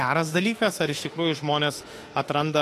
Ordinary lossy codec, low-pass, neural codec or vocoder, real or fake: AAC, 48 kbps; 14.4 kHz; autoencoder, 48 kHz, 32 numbers a frame, DAC-VAE, trained on Japanese speech; fake